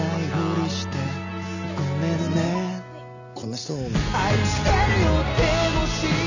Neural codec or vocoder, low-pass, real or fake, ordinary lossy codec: none; 7.2 kHz; real; none